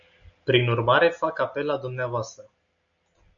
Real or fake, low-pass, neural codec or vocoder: real; 7.2 kHz; none